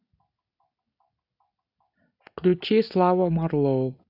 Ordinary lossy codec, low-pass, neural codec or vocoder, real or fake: none; 5.4 kHz; none; real